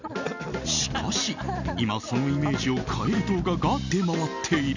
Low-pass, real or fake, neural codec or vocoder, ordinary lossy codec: 7.2 kHz; real; none; none